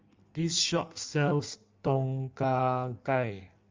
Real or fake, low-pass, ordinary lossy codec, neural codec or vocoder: fake; 7.2 kHz; Opus, 32 kbps; codec, 16 kHz in and 24 kHz out, 1.1 kbps, FireRedTTS-2 codec